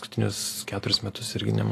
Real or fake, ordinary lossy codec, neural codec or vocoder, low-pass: real; AAC, 48 kbps; none; 14.4 kHz